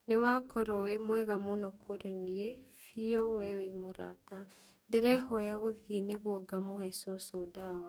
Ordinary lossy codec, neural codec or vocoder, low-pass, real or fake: none; codec, 44.1 kHz, 2.6 kbps, DAC; none; fake